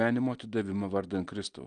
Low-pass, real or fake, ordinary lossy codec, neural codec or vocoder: 9.9 kHz; real; Opus, 24 kbps; none